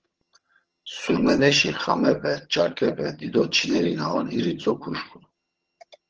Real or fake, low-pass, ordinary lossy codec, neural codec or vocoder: fake; 7.2 kHz; Opus, 16 kbps; vocoder, 22.05 kHz, 80 mel bands, HiFi-GAN